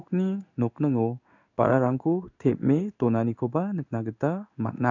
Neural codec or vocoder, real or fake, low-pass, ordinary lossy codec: codec, 16 kHz in and 24 kHz out, 1 kbps, XY-Tokenizer; fake; 7.2 kHz; none